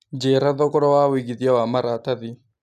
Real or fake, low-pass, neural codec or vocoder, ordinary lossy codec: real; 14.4 kHz; none; none